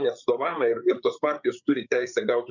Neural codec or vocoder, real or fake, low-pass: codec, 16 kHz, 8 kbps, FreqCodec, larger model; fake; 7.2 kHz